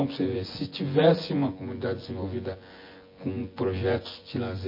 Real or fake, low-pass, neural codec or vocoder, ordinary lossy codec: fake; 5.4 kHz; vocoder, 24 kHz, 100 mel bands, Vocos; MP3, 24 kbps